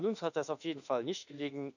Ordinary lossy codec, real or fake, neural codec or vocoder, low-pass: none; fake; autoencoder, 48 kHz, 32 numbers a frame, DAC-VAE, trained on Japanese speech; 7.2 kHz